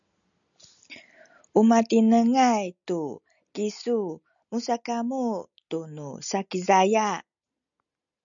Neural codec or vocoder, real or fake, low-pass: none; real; 7.2 kHz